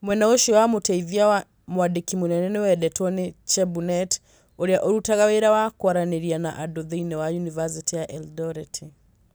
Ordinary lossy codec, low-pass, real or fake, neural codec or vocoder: none; none; real; none